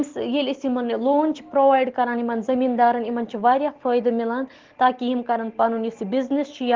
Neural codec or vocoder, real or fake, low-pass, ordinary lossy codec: none; real; 7.2 kHz; Opus, 16 kbps